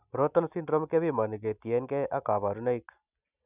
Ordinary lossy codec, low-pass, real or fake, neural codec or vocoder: none; 3.6 kHz; real; none